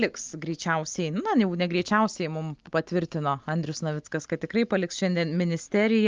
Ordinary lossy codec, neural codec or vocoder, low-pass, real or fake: Opus, 32 kbps; none; 7.2 kHz; real